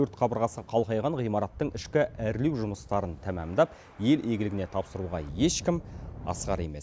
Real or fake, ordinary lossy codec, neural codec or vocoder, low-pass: real; none; none; none